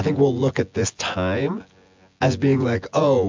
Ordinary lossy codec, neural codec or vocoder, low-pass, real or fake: MP3, 64 kbps; vocoder, 24 kHz, 100 mel bands, Vocos; 7.2 kHz; fake